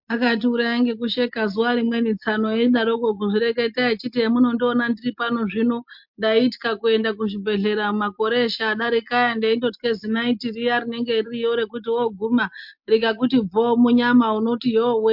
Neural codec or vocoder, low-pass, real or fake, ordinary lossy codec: none; 5.4 kHz; real; MP3, 48 kbps